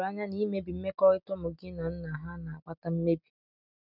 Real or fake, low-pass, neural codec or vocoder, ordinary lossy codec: real; 5.4 kHz; none; none